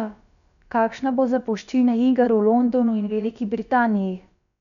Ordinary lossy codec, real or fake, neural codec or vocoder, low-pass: none; fake; codec, 16 kHz, about 1 kbps, DyCAST, with the encoder's durations; 7.2 kHz